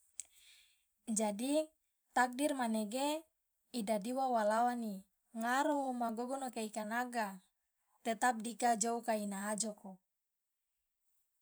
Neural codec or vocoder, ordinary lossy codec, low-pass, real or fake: vocoder, 44.1 kHz, 128 mel bands every 512 samples, BigVGAN v2; none; none; fake